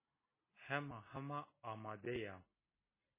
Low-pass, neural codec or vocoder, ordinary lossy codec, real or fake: 3.6 kHz; none; MP3, 16 kbps; real